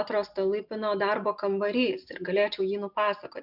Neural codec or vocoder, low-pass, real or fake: none; 5.4 kHz; real